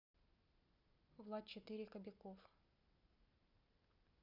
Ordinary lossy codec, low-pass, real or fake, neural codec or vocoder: none; 5.4 kHz; real; none